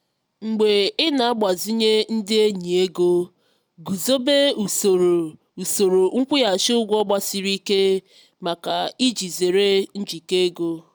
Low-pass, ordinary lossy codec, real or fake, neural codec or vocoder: none; none; real; none